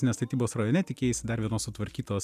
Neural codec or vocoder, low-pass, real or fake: none; 14.4 kHz; real